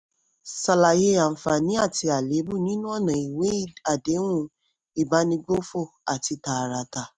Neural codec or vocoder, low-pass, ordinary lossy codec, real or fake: none; none; none; real